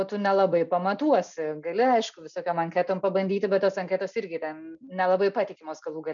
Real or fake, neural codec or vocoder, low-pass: real; none; 7.2 kHz